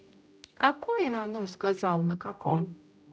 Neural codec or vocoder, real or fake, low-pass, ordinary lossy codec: codec, 16 kHz, 0.5 kbps, X-Codec, HuBERT features, trained on general audio; fake; none; none